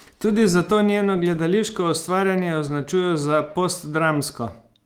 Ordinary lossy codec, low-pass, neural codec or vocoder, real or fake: Opus, 24 kbps; 19.8 kHz; autoencoder, 48 kHz, 128 numbers a frame, DAC-VAE, trained on Japanese speech; fake